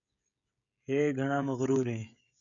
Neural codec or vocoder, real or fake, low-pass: codec, 16 kHz, 16 kbps, FreqCodec, smaller model; fake; 7.2 kHz